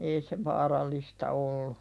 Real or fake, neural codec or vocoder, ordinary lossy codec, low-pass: real; none; none; none